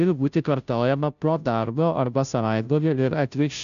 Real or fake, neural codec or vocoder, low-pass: fake; codec, 16 kHz, 0.5 kbps, FunCodec, trained on Chinese and English, 25 frames a second; 7.2 kHz